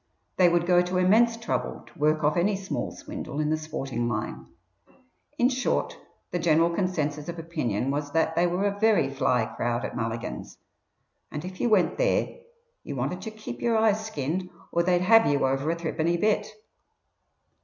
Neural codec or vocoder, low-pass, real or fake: none; 7.2 kHz; real